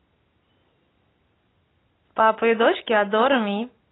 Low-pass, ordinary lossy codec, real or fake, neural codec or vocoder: 7.2 kHz; AAC, 16 kbps; real; none